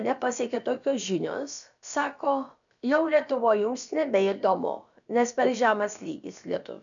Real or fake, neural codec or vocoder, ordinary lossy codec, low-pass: fake; codec, 16 kHz, about 1 kbps, DyCAST, with the encoder's durations; MP3, 96 kbps; 7.2 kHz